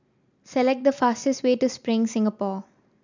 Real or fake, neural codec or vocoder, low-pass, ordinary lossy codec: real; none; 7.2 kHz; none